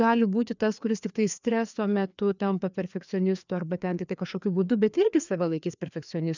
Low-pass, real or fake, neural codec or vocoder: 7.2 kHz; fake; codec, 16 kHz, 2 kbps, FreqCodec, larger model